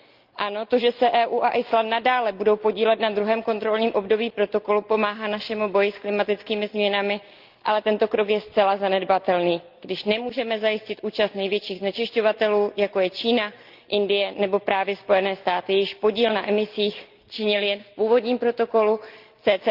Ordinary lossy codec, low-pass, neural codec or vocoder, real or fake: Opus, 32 kbps; 5.4 kHz; none; real